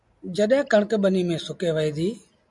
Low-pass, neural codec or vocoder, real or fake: 10.8 kHz; none; real